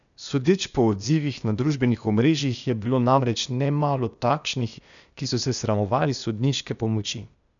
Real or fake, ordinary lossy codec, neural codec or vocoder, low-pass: fake; none; codec, 16 kHz, 0.8 kbps, ZipCodec; 7.2 kHz